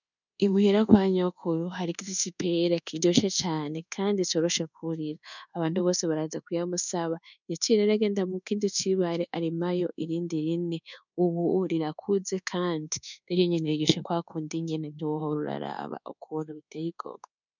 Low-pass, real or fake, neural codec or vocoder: 7.2 kHz; fake; codec, 24 kHz, 1.2 kbps, DualCodec